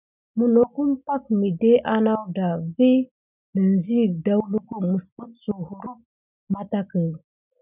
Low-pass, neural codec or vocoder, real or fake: 3.6 kHz; none; real